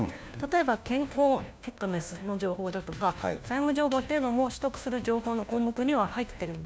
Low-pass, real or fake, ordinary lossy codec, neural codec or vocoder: none; fake; none; codec, 16 kHz, 1 kbps, FunCodec, trained on LibriTTS, 50 frames a second